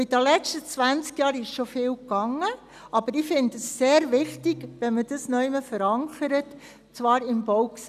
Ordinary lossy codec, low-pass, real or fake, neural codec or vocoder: none; 14.4 kHz; real; none